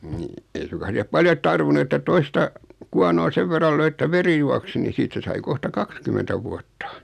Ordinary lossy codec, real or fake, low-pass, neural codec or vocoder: none; real; 14.4 kHz; none